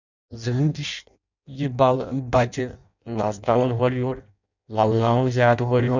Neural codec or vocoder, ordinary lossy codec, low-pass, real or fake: codec, 16 kHz in and 24 kHz out, 0.6 kbps, FireRedTTS-2 codec; none; 7.2 kHz; fake